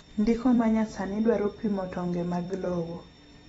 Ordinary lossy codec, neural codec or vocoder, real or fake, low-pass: AAC, 24 kbps; vocoder, 44.1 kHz, 128 mel bands every 256 samples, BigVGAN v2; fake; 19.8 kHz